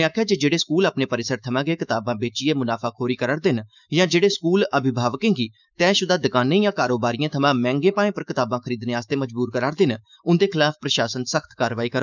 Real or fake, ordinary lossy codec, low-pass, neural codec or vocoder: fake; none; 7.2 kHz; autoencoder, 48 kHz, 128 numbers a frame, DAC-VAE, trained on Japanese speech